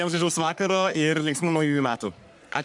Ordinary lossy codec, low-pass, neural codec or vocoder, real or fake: MP3, 96 kbps; 10.8 kHz; codec, 44.1 kHz, 3.4 kbps, Pupu-Codec; fake